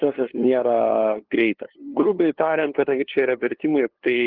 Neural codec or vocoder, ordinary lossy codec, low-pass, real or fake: codec, 16 kHz, 2 kbps, FunCodec, trained on LibriTTS, 25 frames a second; Opus, 16 kbps; 5.4 kHz; fake